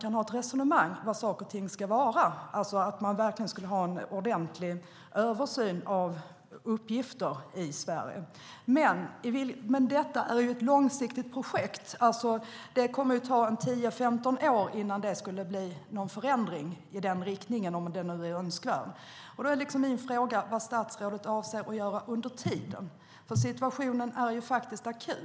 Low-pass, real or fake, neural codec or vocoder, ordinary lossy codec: none; real; none; none